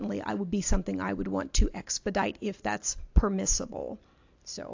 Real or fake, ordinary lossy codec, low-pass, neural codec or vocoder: real; AAC, 48 kbps; 7.2 kHz; none